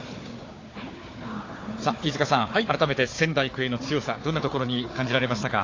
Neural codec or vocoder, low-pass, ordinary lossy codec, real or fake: codec, 16 kHz, 4 kbps, FunCodec, trained on Chinese and English, 50 frames a second; 7.2 kHz; AAC, 32 kbps; fake